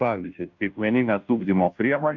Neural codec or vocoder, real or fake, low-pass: codec, 16 kHz, 0.5 kbps, FunCodec, trained on Chinese and English, 25 frames a second; fake; 7.2 kHz